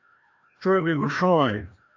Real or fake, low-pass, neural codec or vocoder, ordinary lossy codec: fake; 7.2 kHz; codec, 16 kHz, 1 kbps, FreqCodec, larger model; AAC, 48 kbps